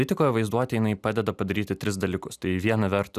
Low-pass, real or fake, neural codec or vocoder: 14.4 kHz; real; none